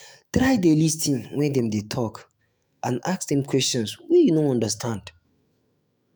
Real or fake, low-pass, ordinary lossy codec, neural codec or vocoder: fake; none; none; autoencoder, 48 kHz, 128 numbers a frame, DAC-VAE, trained on Japanese speech